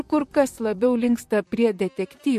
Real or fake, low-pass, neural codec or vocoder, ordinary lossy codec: fake; 14.4 kHz; vocoder, 44.1 kHz, 128 mel bands, Pupu-Vocoder; MP3, 64 kbps